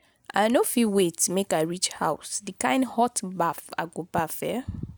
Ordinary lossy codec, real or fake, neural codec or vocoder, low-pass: none; real; none; none